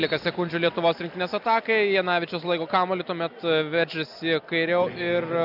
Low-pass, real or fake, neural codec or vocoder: 5.4 kHz; real; none